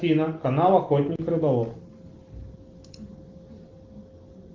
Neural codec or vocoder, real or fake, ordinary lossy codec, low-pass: none; real; Opus, 16 kbps; 7.2 kHz